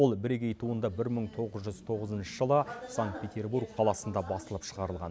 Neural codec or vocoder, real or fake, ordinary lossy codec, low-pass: none; real; none; none